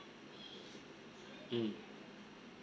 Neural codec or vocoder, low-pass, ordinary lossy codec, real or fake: none; none; none; real